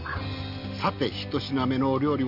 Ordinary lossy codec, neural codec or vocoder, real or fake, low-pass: none; none; real; 5.4 kHz